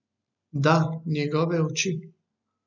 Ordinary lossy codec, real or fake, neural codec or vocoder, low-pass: none; real; none; 7.2 kHz